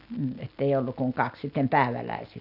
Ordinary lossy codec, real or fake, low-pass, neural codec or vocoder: none; real; 5.4 kHz; none